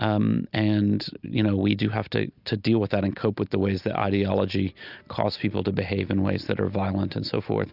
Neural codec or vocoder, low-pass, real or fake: none; 5.4 kHz; real